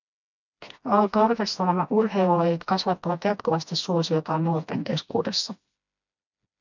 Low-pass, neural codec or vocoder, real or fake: 7.2 kHz; codec, 16 kHz, 1 kbps, FreqCodec, smaller model; fake